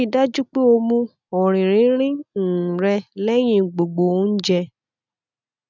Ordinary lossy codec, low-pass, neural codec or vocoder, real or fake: none; 7.2 kHz; none; real